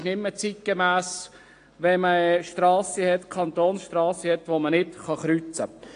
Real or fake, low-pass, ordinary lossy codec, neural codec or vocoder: real; 9.9 kHz; AAC, 48 kbps; none